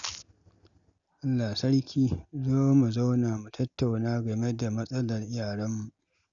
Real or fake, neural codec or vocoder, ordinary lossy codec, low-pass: real; none; none; 7.2 kHz